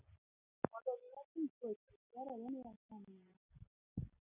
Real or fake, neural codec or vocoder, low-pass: real; none; 3.6 kHz